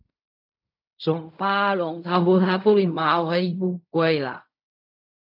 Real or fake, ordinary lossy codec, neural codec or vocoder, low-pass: fake; AAC, 48 kbps; codec, 16 kHz in and 24 kHz out, 0.4 kbps, LongCat-Audio-Codec, fine tuned four codebook decoder; 5.4 kHz